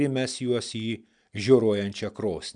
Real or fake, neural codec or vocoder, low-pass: real; none; 9.9 kHz